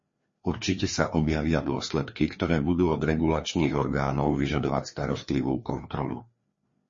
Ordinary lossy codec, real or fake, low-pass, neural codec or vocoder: MP3, 32 kbps; fake; 7.2 kHz; codec, 16 kHz, 2 kbps, FreqCodec, larger model